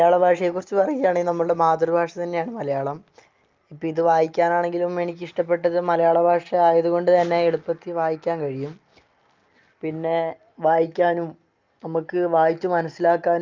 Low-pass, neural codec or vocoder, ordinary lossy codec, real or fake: 7.2 kHz; none; Opus, 32 kbps; real